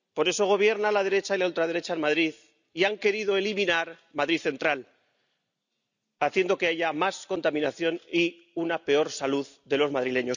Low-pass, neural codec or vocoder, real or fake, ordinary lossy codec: 7.2 kHz; none; real; none